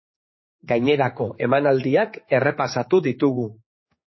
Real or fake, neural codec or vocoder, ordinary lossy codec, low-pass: fake; codec, 16 kHz, 4 kbps, X-Codec, HuBERT features, trained on general audio; MP3, 24 kbps; 7.2 kHz